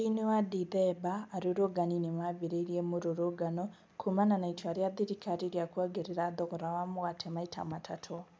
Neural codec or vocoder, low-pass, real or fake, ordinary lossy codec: none; none; real; none